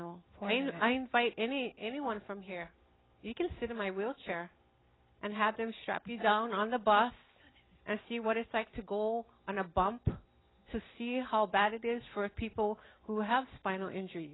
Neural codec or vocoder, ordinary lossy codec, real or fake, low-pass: none; AAC, 16 kbps; real; 7.2 kHz